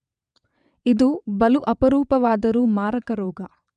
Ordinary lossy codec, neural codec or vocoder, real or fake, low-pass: none; vocoder, 22.05 kHz, 80 mel bands, WaveNeXt; fake; 9.9 kHz